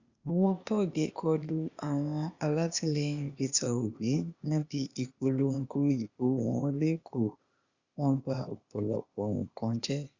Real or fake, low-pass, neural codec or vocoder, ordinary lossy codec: fake; 7.2 kHz; codec, 16 kHz, 0.8 kbps, ZipCodec; Opus, 64 kbps